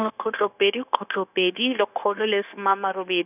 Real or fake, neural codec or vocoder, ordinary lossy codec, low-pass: fake; codec, 16 kHz, 0.9 kbps, LongCat-Audio-Codec; none; 3.6 kHz